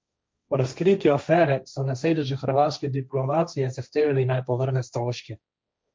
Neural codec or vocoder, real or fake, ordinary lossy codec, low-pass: codec, 16 kHz, 1.1 kbps, Voila-Tokenizer; fake; none; none